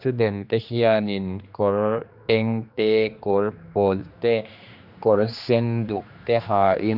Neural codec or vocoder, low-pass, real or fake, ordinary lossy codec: codec, 16 kHz, 2 kbps, X-Codec, HuBERT features, trained on general audio; 5.4 kHz; fake; none